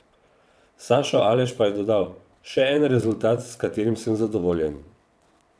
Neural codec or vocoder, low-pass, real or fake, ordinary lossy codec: vocoder, 22.05 kHz, 80 mel bands, WaveNeXt; none; fake; none